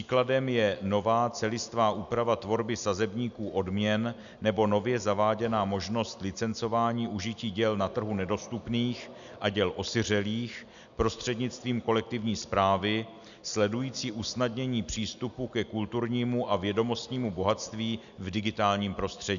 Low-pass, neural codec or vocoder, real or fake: 7.2 kHz; none; real